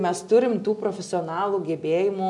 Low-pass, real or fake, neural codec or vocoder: 10.8 kHz; real; none